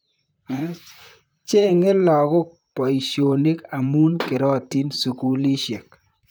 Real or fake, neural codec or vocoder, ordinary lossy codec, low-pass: fake; vocoder, 44.1 kHz, 128 mel bands, Pupu-Vocoder; none; none